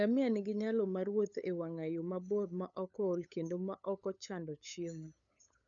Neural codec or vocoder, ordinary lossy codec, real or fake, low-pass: codec, 16 kHz, 8 kbps, FunCodec, trained on LibriTTS, 25 frames a second; none; fake; 7.2 kHz